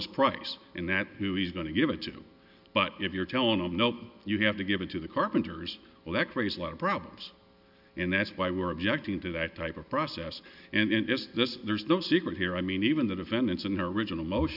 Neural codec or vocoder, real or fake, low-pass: none; real; 5.4 kHz